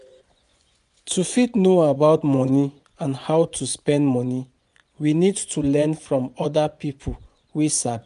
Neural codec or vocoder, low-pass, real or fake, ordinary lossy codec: vocoder, 24 kHz, 100 mel bands, Vocos; 10.8 kHz; fake; none